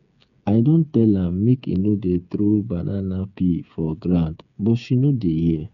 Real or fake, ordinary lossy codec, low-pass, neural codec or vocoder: fake; none; 7.2 kHz; codec, 16 kHz, 8 kbps, FreqCodec, smaller model